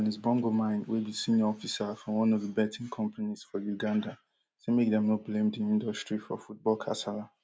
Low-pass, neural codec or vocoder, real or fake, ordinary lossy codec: none; none; real; none